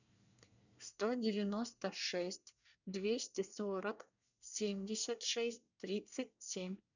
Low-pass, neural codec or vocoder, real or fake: 7.2 kHz; codec, 24 kHz, 1 kbps, SNAC; fake